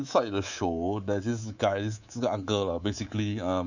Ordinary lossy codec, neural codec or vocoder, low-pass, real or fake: none; codec, 24 kHz, 3.1 kbps, DualCodec; 7.2 kHz; fake